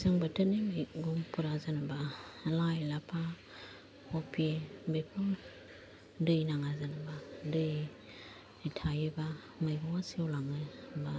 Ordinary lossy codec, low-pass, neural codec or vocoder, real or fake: none; none; none; real